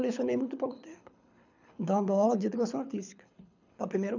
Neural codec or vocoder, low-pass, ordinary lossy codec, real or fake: codec, 24 kHz, 6 kbps, HILCodec; 7.2 kHz; none; fake